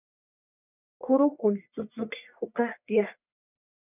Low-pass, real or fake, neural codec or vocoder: 3.6 kHz; fake; codec, 44.1 kHz, 1.7 kbps, Pupu-Codec